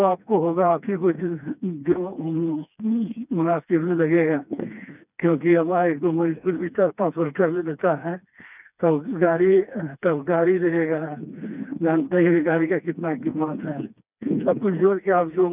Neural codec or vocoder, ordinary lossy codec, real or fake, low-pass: codec, 16 kHz, 2 kbps, FreqCodec, smaller model; none; fake; 3.6 kHz